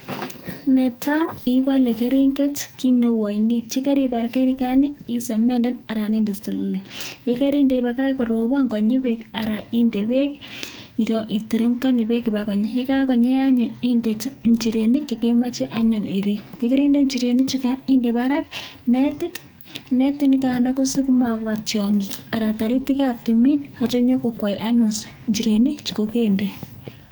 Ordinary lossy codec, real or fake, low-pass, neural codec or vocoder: none; fake; none; codec, 44.1 kHz, 2.6 kbps, SNAC